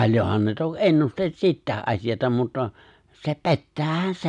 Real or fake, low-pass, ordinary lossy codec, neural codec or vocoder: real; 10.8 kHz; none; none